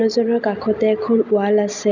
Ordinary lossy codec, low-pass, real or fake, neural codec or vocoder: none; 7.2 kHz; real; none